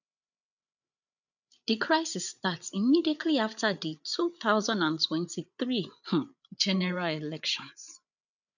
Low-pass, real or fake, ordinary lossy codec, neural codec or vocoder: 7.2 kHz; fake; none; codec, 16 kHz, 8 kbps, FreqCodec, larger model